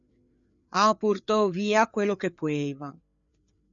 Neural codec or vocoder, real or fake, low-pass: codec, 16 kHz, 4 kbps, FreqCodec, larger model; fake; 7.2 kHz